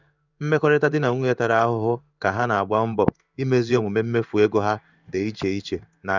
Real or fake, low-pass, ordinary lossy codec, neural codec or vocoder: fake; 7.2 kHz; none; codec, 16 kHz in and 24 kHz out, 1 kbps, XY-Tokenizer